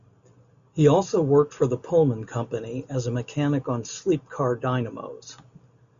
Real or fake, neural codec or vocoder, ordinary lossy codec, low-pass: real; none; AAC, 96 kbps; 7.2 kHz